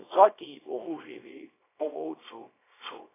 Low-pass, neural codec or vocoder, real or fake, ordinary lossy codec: 3.6 kHz; codec, 24 kHz, 0.9 kbps, WavTokenizer, small release; fake; AAC, 16 kbps